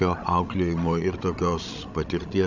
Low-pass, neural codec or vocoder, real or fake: 7.2 kHz; codec, 16 kHz, 16 kbps, FreqCodec, larger model; fake